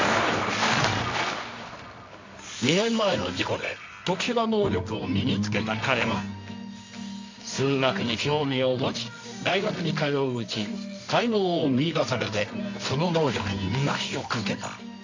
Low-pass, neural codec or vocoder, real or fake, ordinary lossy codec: 7.2 kHz; codec, 24 kHz, 0.9 kbps, WavTokenizer, medium music audio release; fake; MP3, 64 kbps